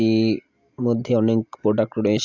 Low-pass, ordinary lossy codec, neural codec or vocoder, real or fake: 7.2 kHz; AAC, 48 kbps; none; real